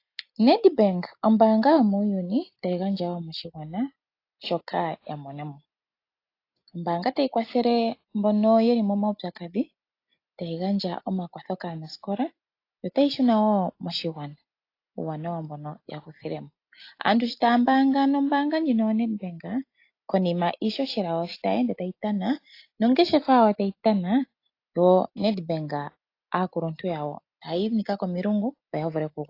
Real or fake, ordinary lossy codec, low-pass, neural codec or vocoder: real; AAC, 32 kbps; 5.4 kHz; none